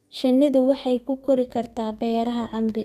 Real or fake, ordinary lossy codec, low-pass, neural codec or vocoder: fake; none; 14.4 kHz; codec, 32 kHz, 1.9 kbps, SNAC